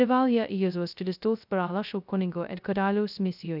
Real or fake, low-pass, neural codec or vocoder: fake; 5.4 kHz; codec, 16 kHz, 0.2 kbps, FocalCodec